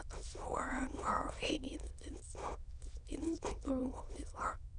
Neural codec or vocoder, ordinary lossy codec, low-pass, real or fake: autoencoder, 22.05 kHz, a latent of 192 numbers a frame, VITS, trained on many speakers; none; 9.9 kHz; fake